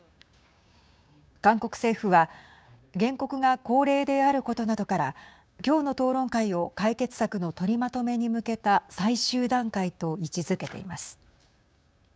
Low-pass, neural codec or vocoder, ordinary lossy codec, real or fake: none; codec, 16 kHz, 6 kbps, DAC; none; fake